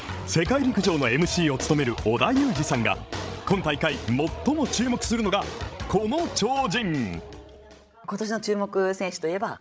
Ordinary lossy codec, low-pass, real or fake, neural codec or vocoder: none; none; fake; codec, 16 kHz, 16 kbps, FreqCodec, larger model